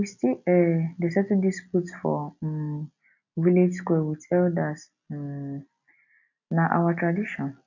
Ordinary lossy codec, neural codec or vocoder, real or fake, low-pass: none; none; real; 7.2 kHz